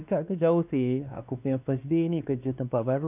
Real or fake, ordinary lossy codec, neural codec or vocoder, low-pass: fake; none; codec, 16 kHz, 2 kbps, X-Codec, WavLM features, trained on Multilingual LibriSpeech; 3.6 kHz